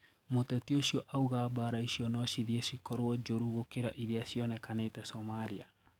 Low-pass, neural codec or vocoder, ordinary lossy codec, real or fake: 19.8 kHz; codec, 44.1 kHz, 7.8 kbps, DAC; none; fake